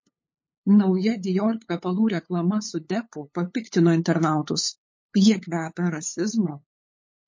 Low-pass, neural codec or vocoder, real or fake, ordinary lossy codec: 7.2 kHz; codec, 16 kHz, 8 kbps, FunCodec, trained on LibriTTS, 25 frames a second; fake; MP3, 32 kbps